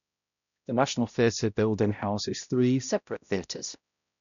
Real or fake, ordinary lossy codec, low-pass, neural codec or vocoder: fake; none; 7.2 kHz; codec, 16 kHz, 0.5 kbps, X-Codec, HuBERT features, trained on balanced general audio